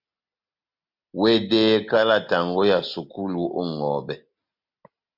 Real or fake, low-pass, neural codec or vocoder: real; 5.4 kHz; none